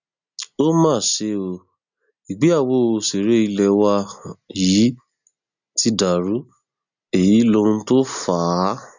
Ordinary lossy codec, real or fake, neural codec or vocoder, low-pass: none; real; none; 7.2 kHz